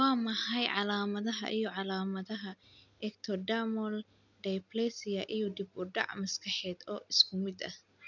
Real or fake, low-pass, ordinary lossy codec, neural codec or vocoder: real; 7.2 kHz; none; none